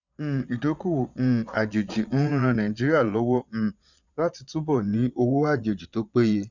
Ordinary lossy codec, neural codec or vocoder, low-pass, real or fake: none; vocoder, 22.05 kHz, 80 mel bands, Vocos; 7.2 kHz; fake